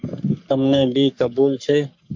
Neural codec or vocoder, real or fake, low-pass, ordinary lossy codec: codec, 44.1 kHz, 3.4 kbps, Pupu-Codec; fake; 7.2 kHz; MP3, 64 kbps